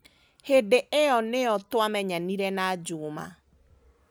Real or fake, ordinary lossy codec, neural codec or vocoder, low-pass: real; none; none; none